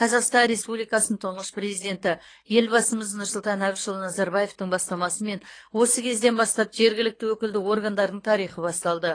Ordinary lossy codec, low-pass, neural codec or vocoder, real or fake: AAC, 32 kbps; 9.9 kHz; codec, 24 kHz, 6 kbps, HILCodec; fake